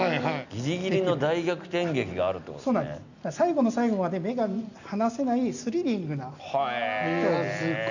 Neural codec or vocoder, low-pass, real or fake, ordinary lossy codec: none; 7.2 kHz; real; none